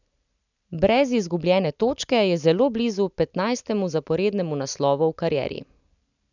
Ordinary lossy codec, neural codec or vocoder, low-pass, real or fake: none; none; 7.2 kHz; real